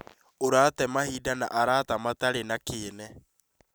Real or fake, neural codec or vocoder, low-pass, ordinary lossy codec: real; none; none; none